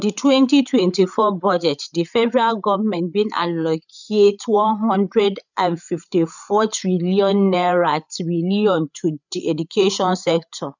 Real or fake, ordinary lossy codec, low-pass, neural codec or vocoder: fake; none; 7.2 kHz; codec, 16 kHz, 8 kbps, FreqCodec, larger model